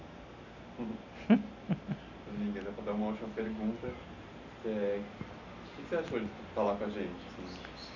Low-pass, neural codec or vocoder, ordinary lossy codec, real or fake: 7.2 kHz; none; none; real